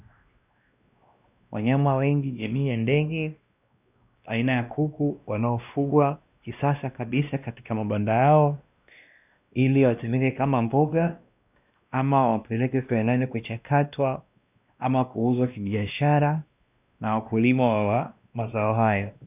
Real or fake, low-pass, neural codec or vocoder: fake; 3.6 kHz; codec, 16 kHz, 1 kbps, X-Codec, WavLM features, trained on Multilingual LibriSpeech